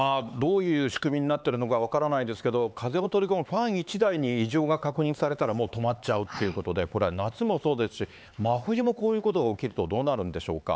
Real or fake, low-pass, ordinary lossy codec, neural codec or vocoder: fake; none; none; codec, 16 kHz, 4 kbps, X-Codec, HuBERT features, trained on LibriSpeech